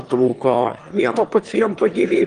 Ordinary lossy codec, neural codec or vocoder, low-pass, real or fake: Opus, 24 kbps; autoencoder, 22.05 kHz, a latent of 192 numbers a frame, VITS, trained on one speaker; 9.9 kHz; fake